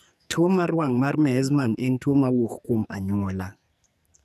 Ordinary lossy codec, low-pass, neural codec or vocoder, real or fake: none; 14.4 kHz; codec, 44.1 kHz, 2.6 kbps, SNAC; fake